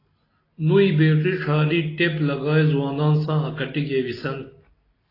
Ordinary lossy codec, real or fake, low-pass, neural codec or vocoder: AAC, 24 kbps; real; 5.4 kHz; none